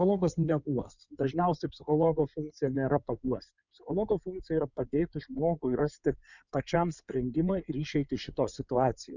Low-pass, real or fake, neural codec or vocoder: 7.2 kHz; fake; codec, 16 kHz in and 24 kHz out, 2.2 kbps, FireRedTTS-2 codec